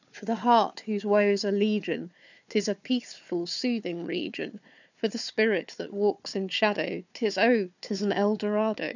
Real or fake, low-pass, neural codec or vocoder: fake; 7.2 kHz; codec, 16 kHz, 4 kbps, FunCodec, trained on Chinese and English, 50 frames a second